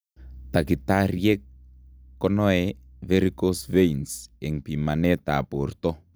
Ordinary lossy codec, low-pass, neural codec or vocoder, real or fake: none; none; none; real